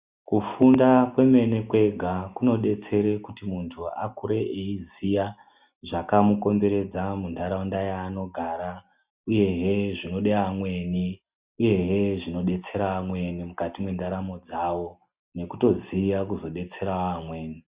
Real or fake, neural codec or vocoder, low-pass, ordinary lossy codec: real; none; 3.6 kHz; Opus, 64 kbps